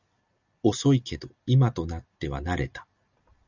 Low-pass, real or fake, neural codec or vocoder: 7.2 kHz; real; none